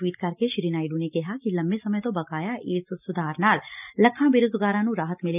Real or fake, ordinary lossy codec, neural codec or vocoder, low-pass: real; none; none; 3.6 kHz